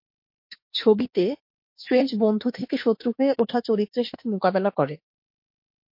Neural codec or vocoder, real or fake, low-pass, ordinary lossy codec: autoencoder, 48 kHz, 32 numbers a frame, DAC-VAE, trained on Japanese speech; fake; 5.4 kHz; MP3, 32 kbps